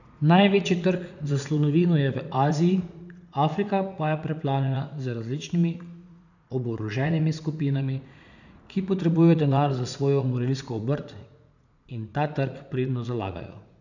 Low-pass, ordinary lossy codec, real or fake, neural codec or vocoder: 7.2 kHz; none; fake; vocoder, 44.1 kHz, 80 mel bands, Vocos